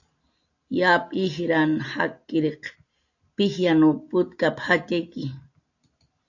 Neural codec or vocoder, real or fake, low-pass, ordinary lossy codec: none; real; 7.2 kHz; AAC, 48 kbps